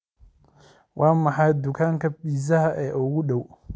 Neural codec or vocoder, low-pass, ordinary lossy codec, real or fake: none; none; none; real